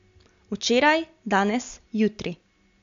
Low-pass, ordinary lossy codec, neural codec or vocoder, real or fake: 7.2 kHz; MP3, 64 kbps; none; real